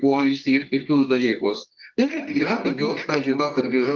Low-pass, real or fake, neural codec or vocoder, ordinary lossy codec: 7.2 kHz; fake; codec, 24 kHz, 0.9 kbps, WavTokenizer, medium music audio release; Opus, 24 kbps